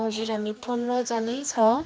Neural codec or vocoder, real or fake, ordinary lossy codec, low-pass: codec, 16 kHz, 2 kbps, X-Codec, HuBERT features, trained on general audio; fake; none; none